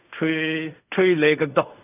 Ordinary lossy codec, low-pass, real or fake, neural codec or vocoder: none; 3.6 kHz; fake; codec, 16 kHz in and 24 kHz out, 0.4 kbps, LongCat-Audio-Codec, fine tuned four codebook decoder